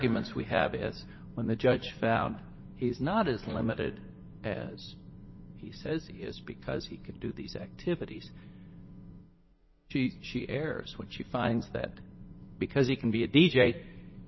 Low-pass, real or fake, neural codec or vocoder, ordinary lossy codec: 7.2 kHz; fake; vocoder, 44.1 kHz, 80 mel bands, Vocos; MP3, 24 kbps